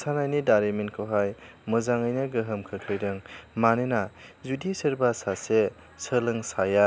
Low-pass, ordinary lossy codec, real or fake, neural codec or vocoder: none; none; real; none